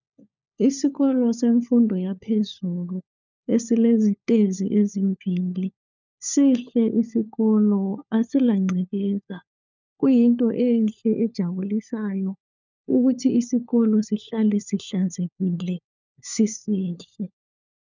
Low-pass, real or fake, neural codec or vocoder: 7.2 kHz; fake; codec, 16 kHz, 4 kbps, FunCodec, trained on LibriTTS, 50 frames a second